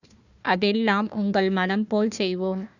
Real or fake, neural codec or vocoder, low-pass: fake; codec, 16 kHz, 1 kbps, FunCodec, trained on Chinese and English, 50 frames a second; 7.2 kHz